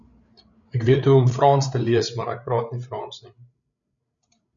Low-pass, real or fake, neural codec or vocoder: 7.2 kHz; fake; codec, 16 kHz, 8 kbps, FreqCodec, larger model